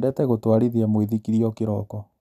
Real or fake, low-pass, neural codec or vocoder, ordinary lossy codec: real; 14.4 kHz; none; none